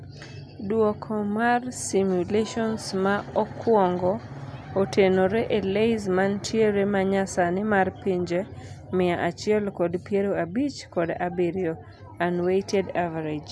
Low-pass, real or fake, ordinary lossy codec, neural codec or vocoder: none; real; none; none